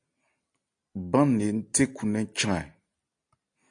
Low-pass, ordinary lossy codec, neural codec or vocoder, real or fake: 9.9 kHz; AAC, 64 kbps; none; real